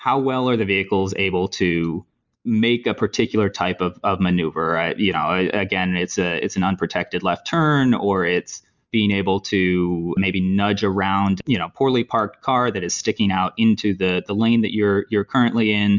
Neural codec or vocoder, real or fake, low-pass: none; real; 7.2 kHz